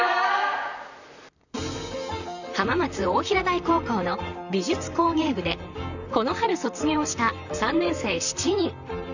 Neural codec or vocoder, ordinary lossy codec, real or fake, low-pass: vocoder, 44.1 kHz, 128 mel bands, Pupu-Vocoder; none; fake; 7.2 kHz